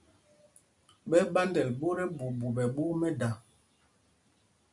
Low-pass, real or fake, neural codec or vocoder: 10.8 kHz; real; none